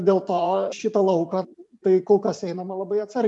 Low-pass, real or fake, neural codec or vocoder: 10.8 kHz; fake; vocoder, 44.1 kHz, 128 mel bands every 512 samples, BigVGAN v2